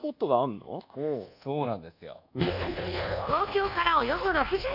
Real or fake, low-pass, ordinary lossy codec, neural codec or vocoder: fake; 5.4 kHz; none; codec, 24 kHz, 1.2 kbps, DualCodec